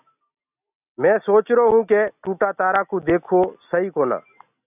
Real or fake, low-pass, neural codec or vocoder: real; 3.6 kHz; none